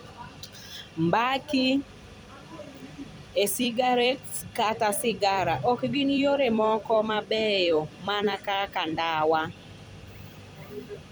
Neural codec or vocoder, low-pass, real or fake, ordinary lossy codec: vocoder, 44.1 kHz, 128 mel bands every 256 samples, BigVGAN v2; none; fake; none